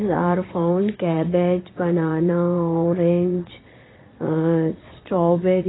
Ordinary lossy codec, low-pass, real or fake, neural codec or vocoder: AAC, 16 kbps; 7.2 kHz; fake; vocoder, 22.05 kHz, 80 mel bands, Vocos